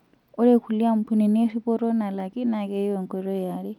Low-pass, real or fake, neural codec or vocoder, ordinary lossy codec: 19.8 kHz; real; none; none